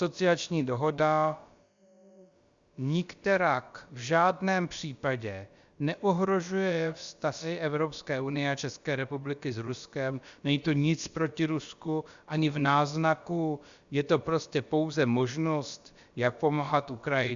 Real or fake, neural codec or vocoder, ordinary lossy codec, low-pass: fake; codec, 16 kHz, about 1 kbps, DyCAST, with the encoder's durations; Opus, 64 kbps; 7.2 kHz